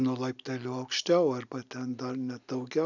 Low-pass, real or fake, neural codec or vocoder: 7.2 kHz; real; none